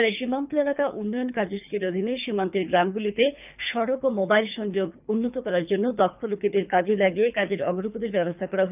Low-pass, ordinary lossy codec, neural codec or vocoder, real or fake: 3.6 kHz; none; codec, 24 kHz, 3 kbps, HILCodec; fake